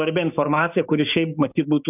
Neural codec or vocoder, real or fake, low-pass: codec, 16 kHz, 6 kbps, DAC; fake; 3.6 kHz